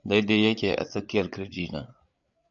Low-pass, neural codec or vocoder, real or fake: 7.2 kHz; codec, 16 kHz, 8 kbps, FreqCodec, larger model; fake